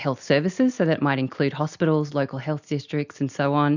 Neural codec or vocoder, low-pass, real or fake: none; 7.2 kHz; real